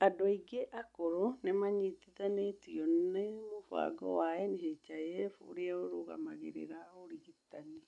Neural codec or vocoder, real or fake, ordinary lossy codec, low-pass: none; real; none; 10.8 kHz